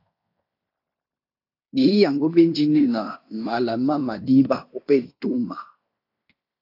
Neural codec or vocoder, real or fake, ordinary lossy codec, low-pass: codec, 16 kHz in and 24 kHz out, 0.9 kbps, LongCat-Audio-Codec, fine tuned four codebook decoder; fake; AAC, 32 kbps; 5.4 kHz